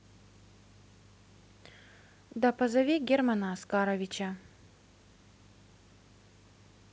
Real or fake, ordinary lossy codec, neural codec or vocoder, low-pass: real; none; none; none